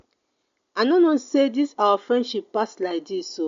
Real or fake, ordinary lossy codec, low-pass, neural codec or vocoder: real; MP3, 48 kbps; 7.2 kHz; none